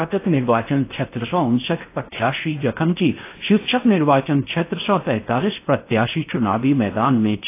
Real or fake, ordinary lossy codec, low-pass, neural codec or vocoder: fake; AAC, 24 kbps; 3.6 kHz; codec, 16 kHz in and 24 kHz out, 0.6 kbps, FocalCodec, streaming, 4096 codes